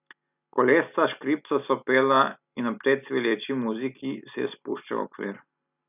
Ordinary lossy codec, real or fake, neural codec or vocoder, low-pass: none; real; none; 3.6 kHz